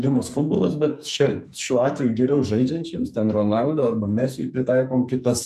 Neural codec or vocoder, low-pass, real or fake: codec, 44.1 kHz, 2.6 kbps, SNAC; 14.4 kHz; fake